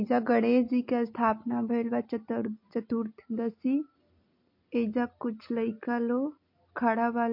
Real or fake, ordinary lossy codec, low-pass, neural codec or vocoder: real; MP3, 32 kbps; 5.4 kHz; none